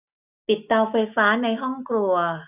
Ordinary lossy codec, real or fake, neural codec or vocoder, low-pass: none; real; none; 3.6 kHz